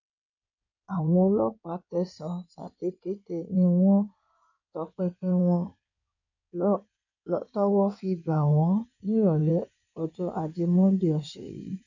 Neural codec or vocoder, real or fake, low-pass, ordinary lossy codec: codec, 16 kHz in and 24 kHz out, 2.2 kbps, FireRedTTS-2 codec; fake; 7.2 kHz; none